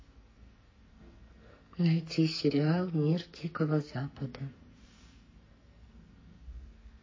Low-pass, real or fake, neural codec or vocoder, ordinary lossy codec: 7.2 kHz; fake; codec, 44.1 kHz, 2.6 kbps, SNAC; MP3, 32 kbps